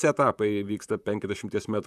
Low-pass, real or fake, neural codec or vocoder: 14.4 kHz; fake; vocoder, 44.1 kHz, 128 mel bands, Pupu-Vocoder